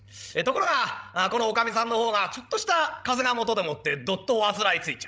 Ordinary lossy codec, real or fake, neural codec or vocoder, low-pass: none; fake; codec, 16 kHz, 16 kbps, FreqCodec, larger model; none